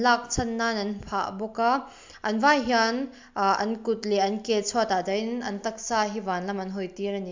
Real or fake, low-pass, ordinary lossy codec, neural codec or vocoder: real; 7.2 kHz; MP3, 64 kbps; none